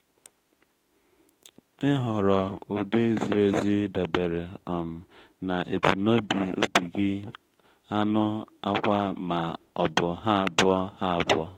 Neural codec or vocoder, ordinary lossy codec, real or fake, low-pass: autoencoder, 48 kHz, 32 numbers a frame, DAC-VAE, trained on Japanese speech; AAC, 48 kbps; fake; 19.8 kHz